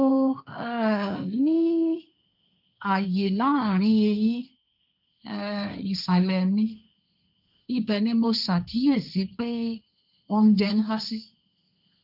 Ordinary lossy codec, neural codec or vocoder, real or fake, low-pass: none; codec, 16 kHz, 1.1 kbps, Voila-Tokenizer; fake; 5.4 kHz